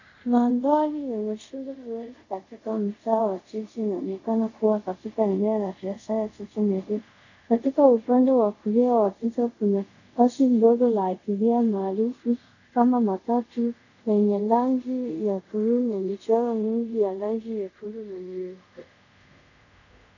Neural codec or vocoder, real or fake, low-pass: codec, 24 kHz, 0.5 kbps, DualCodec; fake; 7.2 kHz